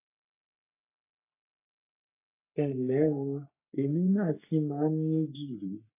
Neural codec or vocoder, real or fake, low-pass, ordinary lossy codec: codec, 44.1 kHz, 3.4 kbps, Pupu-Codec; fake; 3.6 kHz; AAC, 24 kbps